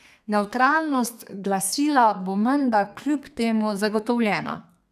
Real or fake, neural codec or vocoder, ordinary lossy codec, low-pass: fake; codec, 32 kHz, 1.9 kbps, SNAC; none; 14.4 kHz